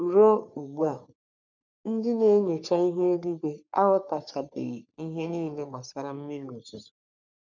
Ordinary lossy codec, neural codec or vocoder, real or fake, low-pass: none; codec, 44.1 kHz, 3.4 kbps, Pupu-Codec; fake; 7.2 kHz